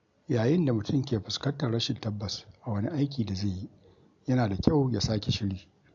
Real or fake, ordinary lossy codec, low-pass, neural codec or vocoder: real; AAC, 64 kbps; 7.2 kHz; none